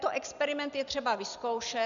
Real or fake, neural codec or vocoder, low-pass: real; none; 7.2 kHz